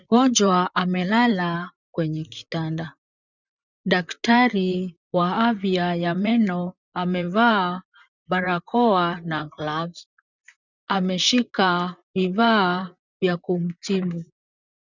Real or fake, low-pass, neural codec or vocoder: fake; 7.2 kHz; vocoder, 44.1 kHz, 128 mel bands, Pupu-Vocoder